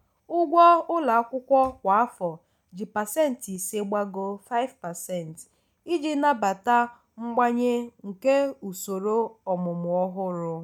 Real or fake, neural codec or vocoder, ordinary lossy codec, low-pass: real; none; none; 19.8 kHz